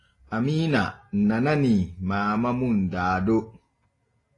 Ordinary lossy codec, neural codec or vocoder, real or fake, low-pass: AAC, 32 kbps; none; real; 10.8 kHz